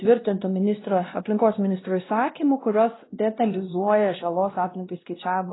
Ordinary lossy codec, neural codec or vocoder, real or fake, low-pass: AAC, 16 kbps; codec, 16 kHz, 1 kbps, X-Codec, WavLM features, trained on Multilingual LibriSpeech; fake; 7.2 kHz